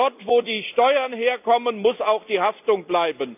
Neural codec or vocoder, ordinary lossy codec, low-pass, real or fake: none; none; 3.6 kHz; real